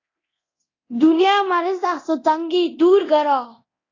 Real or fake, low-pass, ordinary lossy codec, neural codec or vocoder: fake; 7.2 kHz; AAC, 32 kbps; codec, 24 kHz, 0.9 kbps, DualCodec